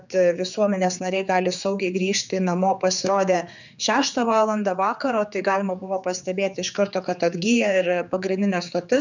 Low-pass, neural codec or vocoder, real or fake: 7.2 kHz; codec, 16 kHz, 4 kbps, X-Codec, HuBERT features, trained on general audio; fake